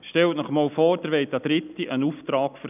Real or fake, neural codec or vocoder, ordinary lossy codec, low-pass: real; none; none; 3.6 kHz